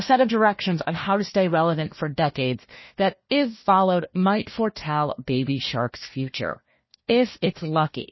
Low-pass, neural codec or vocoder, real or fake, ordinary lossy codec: 7.2 kHz; codec, 16 kHz, 1 kbps, FunCodec, trained on Chinese and English, 50 frames a second; fake; MP3, 24 kbps